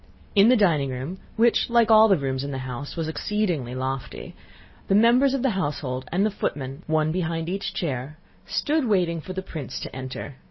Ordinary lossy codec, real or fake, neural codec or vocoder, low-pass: MP3, 24 kbps; real; none; 7.2 kHz